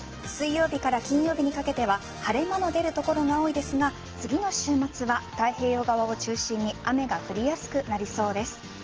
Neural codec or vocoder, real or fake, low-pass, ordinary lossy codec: none; real; 7.2 kHz; Opus, 16 kbps